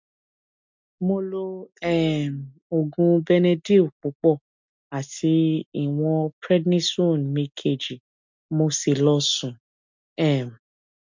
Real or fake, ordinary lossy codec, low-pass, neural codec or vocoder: real; MP3, 64 kbps; 7.2 kHz; none